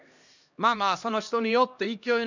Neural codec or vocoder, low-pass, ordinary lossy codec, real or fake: codec, 16 kHz, 1 kbps, X-Codec, HuBERT features, trained on LibriSpeech; 7.2 kHz; none; fake